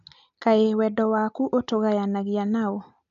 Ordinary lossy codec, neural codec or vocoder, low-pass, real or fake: none; none; 7.2 kHz; real